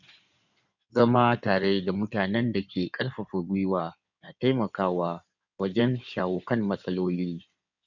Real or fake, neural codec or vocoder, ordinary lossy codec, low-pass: fake; codec, 16 kHz in and 24 kHz out, 2.2 kbps, FireRedTTS-2 codec; none; 7.2 kHz